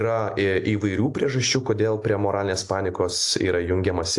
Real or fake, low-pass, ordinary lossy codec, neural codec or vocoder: real; 10.8 kHz; AAC, 64 kbps; none